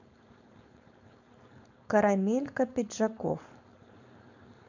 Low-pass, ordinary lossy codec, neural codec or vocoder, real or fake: 7.2 kHz; none; codec, 16 kHz, 4.8 kbps, FACodec; fake